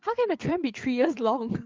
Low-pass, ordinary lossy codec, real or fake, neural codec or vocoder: 7.2 kHz; Opus, 32 kbps; fake; codec, 16 kHz, 8 kbps, FreqCodec, larger model